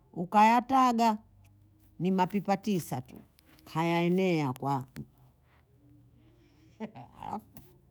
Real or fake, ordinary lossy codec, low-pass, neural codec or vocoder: fake; none; none; autoencoder, 48 kHz, 128 numbers a frame, DAC-VAE, trained on Japanese speech